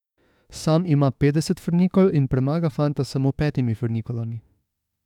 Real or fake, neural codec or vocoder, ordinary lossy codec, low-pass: fake; autoencoder, 48 kHz, 32 numbers a frame, DAC-VAE, trained on Japanese speech; none; 19.8 kHz